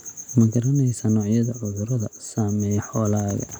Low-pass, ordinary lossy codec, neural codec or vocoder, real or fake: none; none; none; real